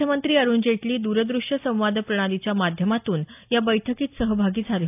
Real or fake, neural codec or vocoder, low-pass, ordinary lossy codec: real; none; 3.6 kHz; AAC, 32 kbps